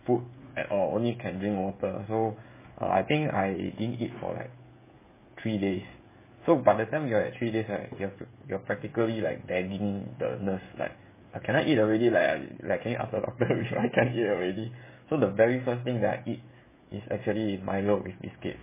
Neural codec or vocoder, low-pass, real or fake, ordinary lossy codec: codec, 16 kHz, 16 kbps, FreqCodec, smaller model; 3.6 kHz; fake; MP3, 16 kbps